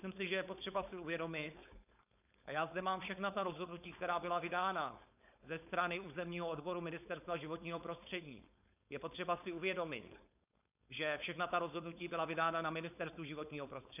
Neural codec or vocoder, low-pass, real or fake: codec, 16 kHz, 4.8 kbps, FACodec; 3.6 kHz; fake